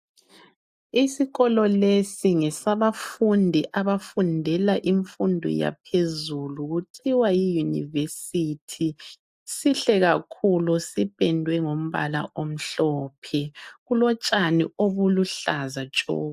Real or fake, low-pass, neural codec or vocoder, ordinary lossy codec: real; 14.4 kHz; none; AAC, 96 kbps